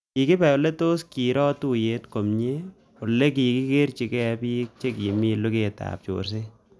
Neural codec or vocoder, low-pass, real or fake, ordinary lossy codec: none; none; real; none